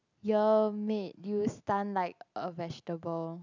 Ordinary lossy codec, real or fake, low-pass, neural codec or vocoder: none; real; 7.2 kHz; none